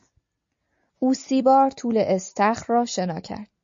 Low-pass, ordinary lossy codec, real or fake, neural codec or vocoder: 7.2 kHz; MP3, 48 kbps; real; none